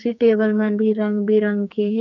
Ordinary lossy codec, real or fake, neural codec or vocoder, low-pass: none; fake; codec, 44.1 kHz, 2.6 kbps, SNAC; 7.2 kHz